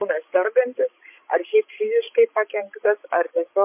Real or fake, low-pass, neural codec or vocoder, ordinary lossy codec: fake; 3.6 kHz; codec, 16 kHz, 16 kbps, FreqCodec, larger model; MP3, 24 kbps